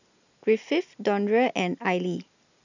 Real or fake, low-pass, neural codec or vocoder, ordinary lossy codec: real; 7.2 kHz; none; none